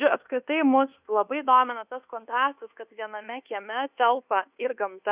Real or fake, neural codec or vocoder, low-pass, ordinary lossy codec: fake; codec, 24 kHz, 1.2 kbps, DualCodec; 3.6 kHz; Opus, 64 kbps